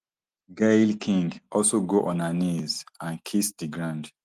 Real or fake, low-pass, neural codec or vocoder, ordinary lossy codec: real; 14.4 kHz; none; Opus, 24 kbps